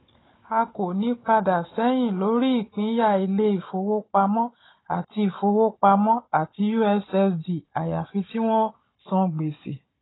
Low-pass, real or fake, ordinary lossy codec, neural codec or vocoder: 7.2 kHz; fake; AAC, 16 kbps; codec, 16 kHz, 16 kbps, FunCodec, trained on Chinese and English, 50 frames a second